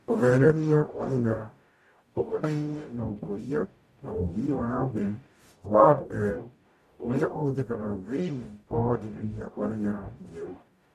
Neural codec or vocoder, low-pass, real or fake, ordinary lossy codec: codec, 44.1 kHz, 0.9 kbps, DAC; 14.4 kHz; fake; MP3, 64 kbps